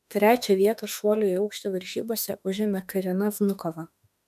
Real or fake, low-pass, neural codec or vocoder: fake; 14.4 kHz; autoencoder, 48 kHz, 32 numbers a frame, DAC-VAE, trained on Japanese speech